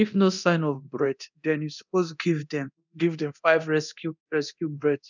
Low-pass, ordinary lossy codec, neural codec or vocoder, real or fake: 7.2 kHz; none; codec, 24 kHz, 0.9 kbps, DualCodec; fake